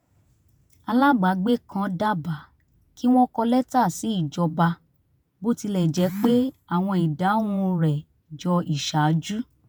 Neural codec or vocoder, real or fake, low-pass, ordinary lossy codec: vocoder, 48 kHz, 128 mel bands, Vocos; fake; none; none